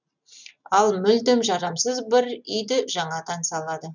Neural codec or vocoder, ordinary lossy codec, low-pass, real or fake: none; none; 7.2 kHz; real